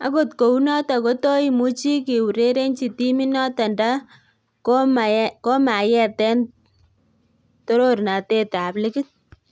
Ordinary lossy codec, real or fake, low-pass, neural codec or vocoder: none; real; none; none